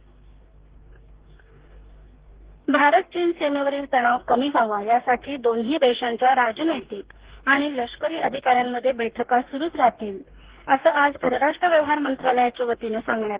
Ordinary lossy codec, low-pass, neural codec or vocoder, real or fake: Opus, 16 kbps; 3.6 kHz; codec, 44.1 kHz, 2.6 kbps, DAC; fake